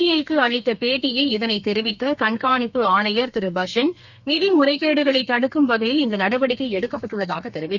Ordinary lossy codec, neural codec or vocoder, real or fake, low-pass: none; codec, 32 kHz, 1.9 kbps, SNAC; fake; 7.2 kHz